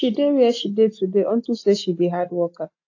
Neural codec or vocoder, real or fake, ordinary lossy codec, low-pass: none; real; AAC, 48 kbps; 7.2 kHz